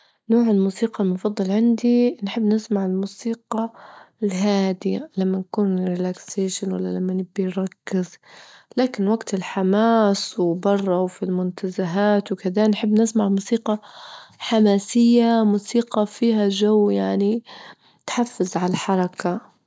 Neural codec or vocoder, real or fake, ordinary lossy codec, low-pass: none; real; none; none